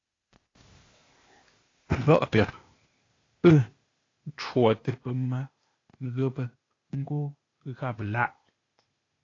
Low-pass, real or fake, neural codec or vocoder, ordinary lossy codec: 7.2 kHz; fake; codec, 16 kHz, 0.8 kbps, ZipCodec; AAC, 32 kbps